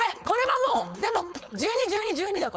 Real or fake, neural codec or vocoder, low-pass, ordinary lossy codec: fake; codec, 16 kHz, 4.8 kbps, FACodec; none; none